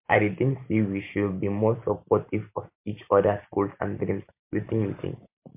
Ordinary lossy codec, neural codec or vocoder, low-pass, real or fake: MP3, 32 kbps; vocoder, 44.1 kHz, 128 mel bands every 512 samples, BigVGAN v2; 3.6 kHz; fake